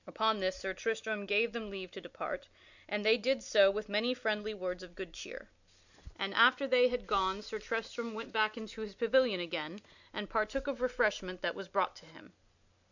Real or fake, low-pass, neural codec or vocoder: real; 7.2 kHz; none